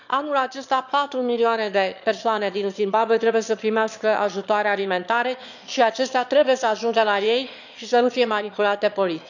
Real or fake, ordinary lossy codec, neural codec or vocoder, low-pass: fake; none; autoencoder, 22.05 kHz, a latent of 192 numbers a frame, VITS, trained on one speaker; 7.2 kHz